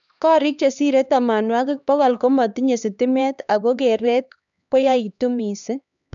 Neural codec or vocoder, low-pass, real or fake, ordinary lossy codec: codec, 16 kHz, 2 kbps, X-Codec, HuBERT features, trained on LibriSpeech; 7.2 kHz; fake; none